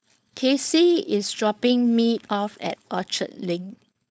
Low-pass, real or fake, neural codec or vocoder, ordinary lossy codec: none; fake; codec, 16 kHz, 4.8 kbps, FACodec; none